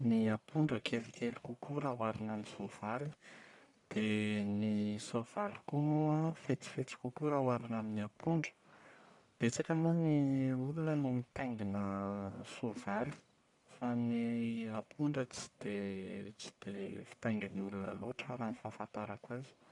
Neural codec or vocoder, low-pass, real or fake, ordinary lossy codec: codec, 44.1 kHz, 1.7 kbps, Pupu-Codec; 10.8 kHz; fake; none